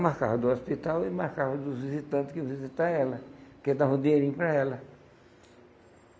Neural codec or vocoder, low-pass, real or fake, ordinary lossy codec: none; none; real; none